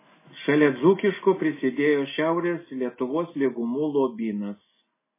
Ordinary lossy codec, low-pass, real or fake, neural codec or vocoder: MP3, 16 kbps; 3.6 kHz; real; none